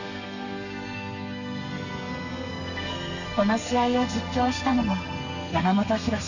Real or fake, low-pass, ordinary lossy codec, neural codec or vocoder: fake; 7.2 kHz; none; codec, 32 kHz, 1.9 kbps, SNAC